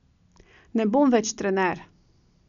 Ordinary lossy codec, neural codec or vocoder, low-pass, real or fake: none; none; 7.2 kHz; real